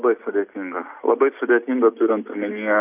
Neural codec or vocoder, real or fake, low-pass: none; real; 3.6 kHz